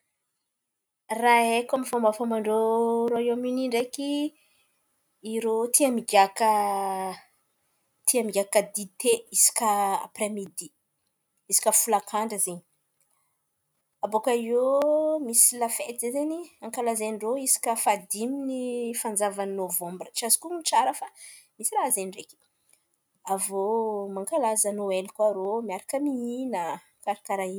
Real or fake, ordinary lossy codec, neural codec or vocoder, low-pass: real; none; none; none